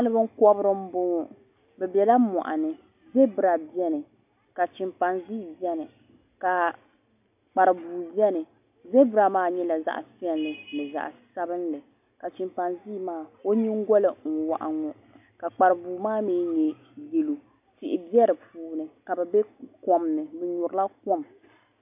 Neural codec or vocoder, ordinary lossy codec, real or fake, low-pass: none; AAC, 32 kbps; real; 3.6 kHz